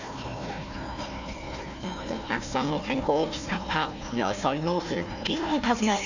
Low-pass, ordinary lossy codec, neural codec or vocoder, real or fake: 7.2 kHz; none; codec, 16 kHz, 1 kbps, FunCodec, trained on Chinese and English, 50 frames a second; fake